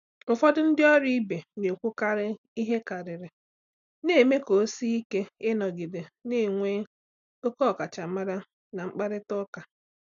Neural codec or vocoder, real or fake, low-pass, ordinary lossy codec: none; real; 7.2 kHz; none